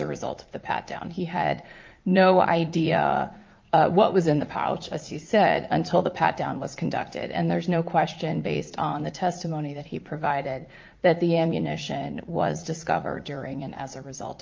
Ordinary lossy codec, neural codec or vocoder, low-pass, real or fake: Opus, 24 kbps; vocoder, 44.1 kHz, 80 mel bands, Vocos; 7.2 kHz; fake